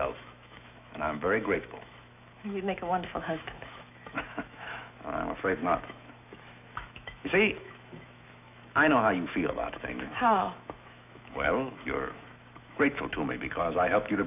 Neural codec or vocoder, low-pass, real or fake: autoencoder, 48 kHz, 128 numbers a frame, DAC-VAE, trained on Japanese speech; 3.6 kHz; fake